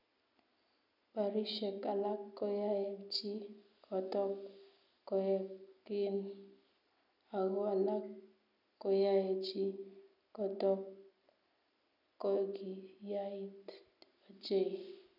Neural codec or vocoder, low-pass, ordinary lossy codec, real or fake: none; 5.4 kHz; none; real